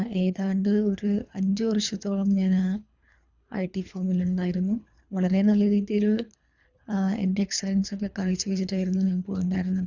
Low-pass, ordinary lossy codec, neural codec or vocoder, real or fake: 7.2 kHz; none; codec, 24 kHz, 3 kbps, HILCodec; fake